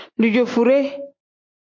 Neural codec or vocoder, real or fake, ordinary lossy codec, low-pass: none; real; MP3, 48 kbps; 7.2 kHz